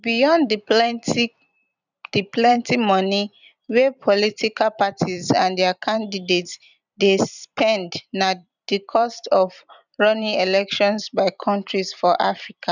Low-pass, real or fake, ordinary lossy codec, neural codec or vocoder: 7.2 kHz; fake; none; vocoder, 44.1 kHz, 128 mel bands every 256 samples, BigVGAN v2